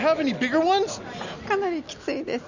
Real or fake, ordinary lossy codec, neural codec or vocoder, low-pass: real; none; none; 7.2 kHz